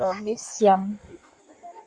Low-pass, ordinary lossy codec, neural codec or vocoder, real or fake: 9.9 kHz; Opus, 64 kbps; codec, 16 kHz in and 24 kHz out, 1.1 kbps, FireRedTTS-2 codec; fake